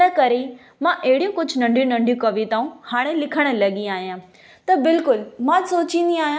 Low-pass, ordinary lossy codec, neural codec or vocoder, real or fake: none; none; none; real